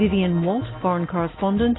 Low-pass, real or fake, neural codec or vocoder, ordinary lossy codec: 7.2 kHz; real; none; AAC, 16 kbps